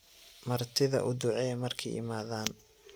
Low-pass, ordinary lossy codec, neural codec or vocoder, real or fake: none; none; none; real